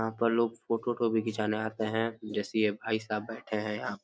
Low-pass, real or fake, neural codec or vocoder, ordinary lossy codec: none; real; none; none